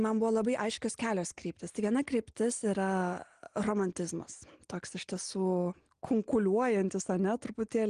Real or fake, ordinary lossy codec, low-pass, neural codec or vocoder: real; Opus, 24 kbps; 9.9 kHz; none